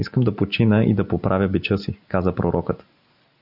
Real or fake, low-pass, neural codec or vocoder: real; 5.4 kHz; none